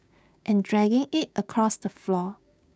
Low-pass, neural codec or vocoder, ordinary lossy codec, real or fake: none; codec, 16 kHz, 6 kbps, DAC; none; fake